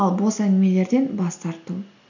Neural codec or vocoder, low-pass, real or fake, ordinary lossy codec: none; 7.2 kHz; real; none